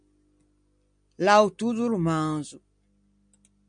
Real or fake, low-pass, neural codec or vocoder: real; 9.9 kHz; none